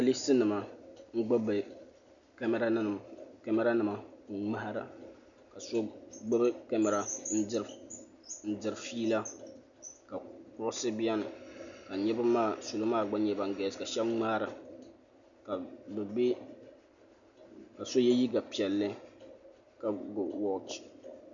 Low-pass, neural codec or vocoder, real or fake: 7.2 kHz; none; real